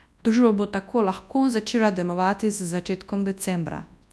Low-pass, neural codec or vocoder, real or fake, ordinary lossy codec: none; codec, 24 kHz, 0.9 kbps, WavTokenizer, large speech release; fake; none